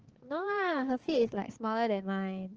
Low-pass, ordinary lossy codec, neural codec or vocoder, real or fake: 7.2 kHz; Opus, 16 kbps; codec, 16 kHz, 4 kbps, X-Codec, HuBERT features, trained on balanced general audio; fake